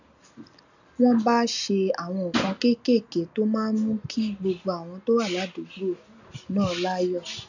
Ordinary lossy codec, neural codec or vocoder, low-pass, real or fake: none; none; 7.2 kHz; real